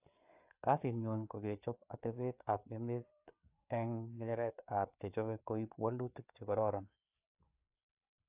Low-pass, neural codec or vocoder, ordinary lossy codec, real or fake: 3.6 kHz; codec, 16 kHz, 4 kbps, FreqCodec, larger model; AAC, 32 kbps; fake